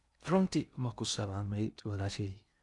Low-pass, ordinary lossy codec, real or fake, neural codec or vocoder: 10.8 kHz; none; fake; codec, 16 kHz in and 24 kHz out, 0.6 kbps, FocalCodec, streaming, 2048 codes